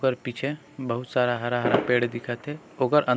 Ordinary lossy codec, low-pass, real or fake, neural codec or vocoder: none; none; real; none